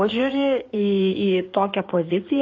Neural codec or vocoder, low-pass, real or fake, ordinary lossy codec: codec, 16 kHz in and 24 kHz out, 2.2 kbps, FireRedTTS-2 codec; 7.2 kHz; fake; AAC, 32 kbps